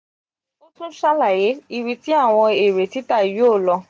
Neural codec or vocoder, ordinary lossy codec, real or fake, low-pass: none; none; real; none